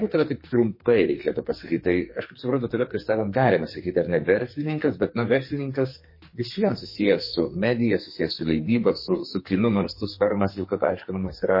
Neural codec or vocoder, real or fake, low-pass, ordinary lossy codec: codec, 44.1 kHz, 2.6 kbps, SNAC; fake; 5.4 kHz; MP3, 24 kbps